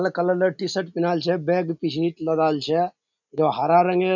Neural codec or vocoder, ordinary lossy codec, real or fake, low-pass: none; none; real; 7.2 kHz